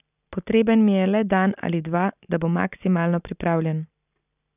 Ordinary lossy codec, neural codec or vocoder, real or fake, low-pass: none; none; real; 3.6 kHz